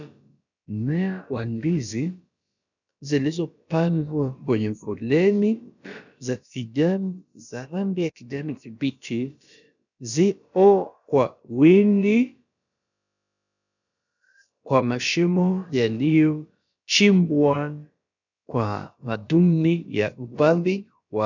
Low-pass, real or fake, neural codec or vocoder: 7.2 kHz; fake; codec, 16 kHz, about 1 kbps, DyCAST, with the encoder's durations